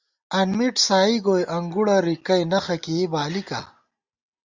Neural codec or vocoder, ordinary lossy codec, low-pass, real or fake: none; Opus, 64 kbps; 7.2 kHz; real